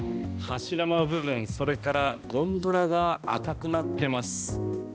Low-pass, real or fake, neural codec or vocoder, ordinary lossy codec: none; fake; codec, 16 kHz, 1 kbps, X-Codec, HuBERT features, trained on balanced general audio; none